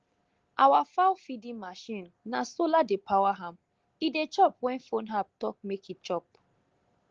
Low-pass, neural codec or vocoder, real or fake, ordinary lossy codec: 7.2 kHz; none; real; Opus, 16 kbps